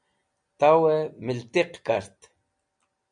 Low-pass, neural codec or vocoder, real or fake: 9.9 kHz; none; real